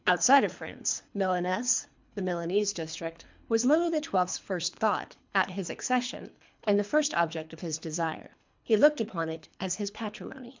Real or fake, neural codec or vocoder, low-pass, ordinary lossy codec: fake; codec, 24 kHz, 3 kbps, HILCodec; 7.2 kHz; MP3, 64 kbps